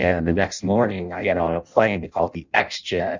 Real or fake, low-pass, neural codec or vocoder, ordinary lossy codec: fake; 7.2 kHz; codec, 16 kHz in and 24 kHz out, 0.6 kbps, FireRedTTS-2 codec; Opus, 64 kbps